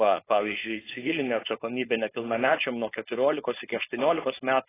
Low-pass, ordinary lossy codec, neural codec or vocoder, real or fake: 3.6 kHz; AAC, 16 kbps; codec, 16 kHz, 4.8 kbps, FACodec; fake